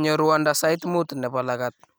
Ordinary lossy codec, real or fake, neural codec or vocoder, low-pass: none; real; none; none